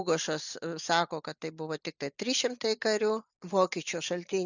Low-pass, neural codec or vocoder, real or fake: 7.2 kHz; none; real